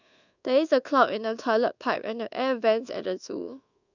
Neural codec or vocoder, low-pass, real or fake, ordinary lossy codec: codec, 24 kHz, 1.2 kbps, DualCodec; 7.2 kHz; fake; none